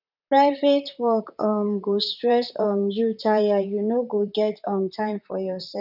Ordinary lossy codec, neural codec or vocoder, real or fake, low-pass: none; vocoder, 44.1 kHz, 128 mel bands, Pupu-Vocoder; fake; 5.4 kHz